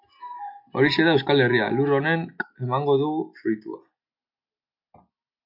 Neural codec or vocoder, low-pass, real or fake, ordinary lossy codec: none; 5.4 kHz; real; MP3, 48 kbps